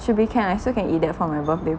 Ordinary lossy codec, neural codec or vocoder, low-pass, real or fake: none; none; none; real